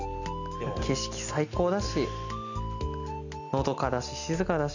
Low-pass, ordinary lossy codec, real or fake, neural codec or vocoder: 7.2 kHz; none; real; none